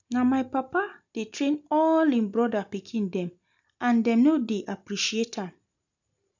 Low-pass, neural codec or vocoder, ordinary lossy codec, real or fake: 7.2 kHz; none; none; real